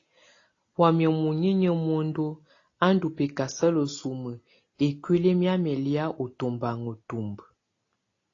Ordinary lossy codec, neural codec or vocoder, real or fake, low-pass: AAC, 32 kbps; none; real; 7.2 kHz